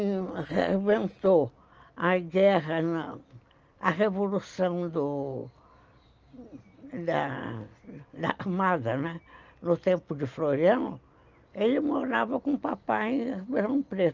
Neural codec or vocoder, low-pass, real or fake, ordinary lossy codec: none; 7.2 kHz; real; Opus, 24 kbps